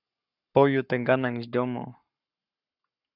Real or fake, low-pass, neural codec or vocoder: fake; 5.4 kHz; codec, 44.1 kHz, 7.8 kbps, Pupu-Codec